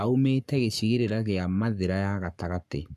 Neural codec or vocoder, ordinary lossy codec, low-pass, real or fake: codec, 44.1 kHz, 7.8 kbps, Pupu-Codec; none; 14.4 kHz; fake